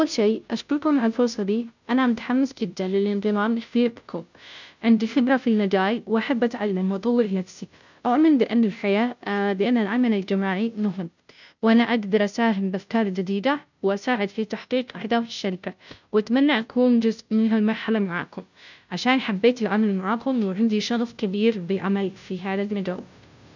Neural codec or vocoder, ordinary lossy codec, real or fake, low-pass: codec, 16 kHz, 0.5 kbps, FunCodec, trained on Chinese and English, 25 frames a second; none; fake; 7.2 kHz